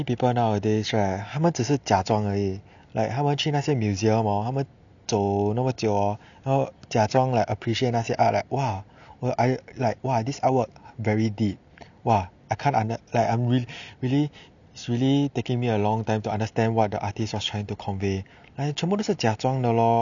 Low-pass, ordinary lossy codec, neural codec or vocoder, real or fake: 7.2 kHz; none; none; real